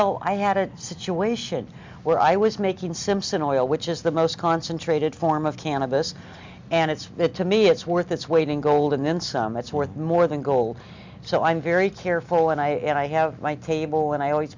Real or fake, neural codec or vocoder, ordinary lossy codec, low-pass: real; none; MP3, 64 kbps; 7.2 kHz